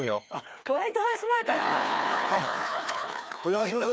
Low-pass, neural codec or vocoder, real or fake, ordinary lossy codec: none; codec, 16 kHz, 2 kbps, FreqCodec, larger model; fake; none